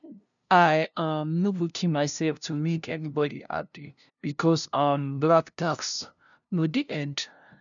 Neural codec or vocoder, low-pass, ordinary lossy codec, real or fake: codec, 16 kHz, 0.5 kbps, FunCodec, trained on LibriTTS, 25 frames a second; 7.2 kHz; none; fake